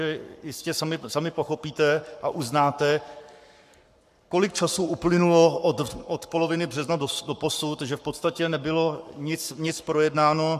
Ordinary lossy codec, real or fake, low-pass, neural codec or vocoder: AAC, 96 kbps; fake; 14.4 kHz; codec, 44.1 kHz, 7.8 kbps, Pupu-Codec